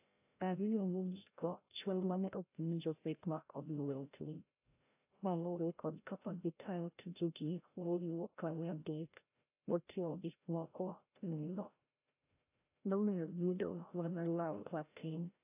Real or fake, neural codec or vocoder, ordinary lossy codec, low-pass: fake; codec, 16 kHz, 0.5 kbps, FreqCodec, larger model; AAC, 32 kbps; 3.6 kHz